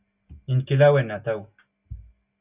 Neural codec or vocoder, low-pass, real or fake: none; 3.6 kHz; real